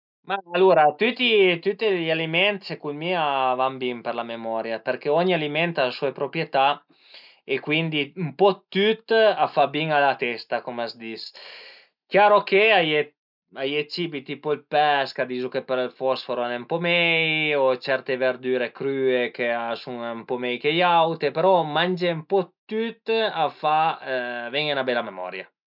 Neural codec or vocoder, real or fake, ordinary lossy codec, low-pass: none; real; none; 5.4 kHz